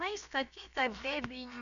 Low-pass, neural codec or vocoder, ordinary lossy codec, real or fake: 7.2 kHz; codec, 16 kHz, about 1 kbps, DyCAST, with the encoder's durations; MP3, 96 kbps; fake